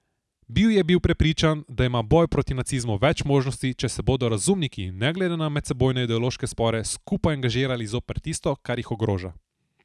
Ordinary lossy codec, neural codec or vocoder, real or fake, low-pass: none; none; real; none